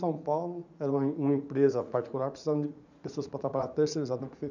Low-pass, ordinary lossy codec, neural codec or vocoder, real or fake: 7.2 kHz; none; vocoder, 44.1 kHz, 80 mel bands, Vocos; fake